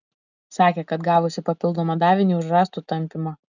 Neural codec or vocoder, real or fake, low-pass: none; real; 7.2 kHz